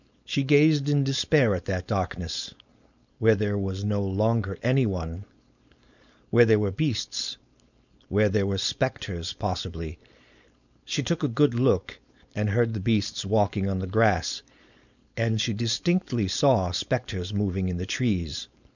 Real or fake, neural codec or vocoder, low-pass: fake; codec, 16 kHz, 4.8 kbps, FACodec; 7.2 kHz